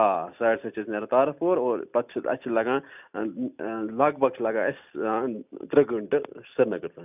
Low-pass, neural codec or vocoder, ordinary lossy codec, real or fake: 3.6 kHz; none; none; real